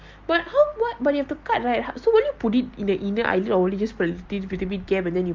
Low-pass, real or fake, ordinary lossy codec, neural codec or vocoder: 7.2 kHz; real; Opus, 24 kbps; none